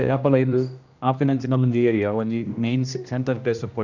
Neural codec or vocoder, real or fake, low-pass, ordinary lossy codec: codec, 16 kHz, 1 kbps, X-Codec, HuBERT features, trained on general audio; fake; 7.2 kHz; none